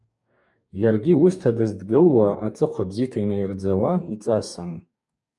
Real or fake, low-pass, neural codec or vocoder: fake; 10.8 kHz; codec, 44.1 kHz, 2.6 kbps, DAC